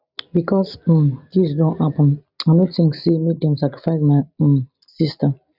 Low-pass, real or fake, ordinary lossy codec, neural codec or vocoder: 5.4 kHz; real; none; none